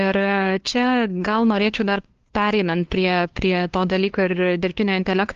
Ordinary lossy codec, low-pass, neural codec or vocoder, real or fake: Opus, 16 kbps; 7.2 kHz; codec, 16 kHz, 2 kbps, FunCodec, trained on LibriTTS, 25 frames a second; fake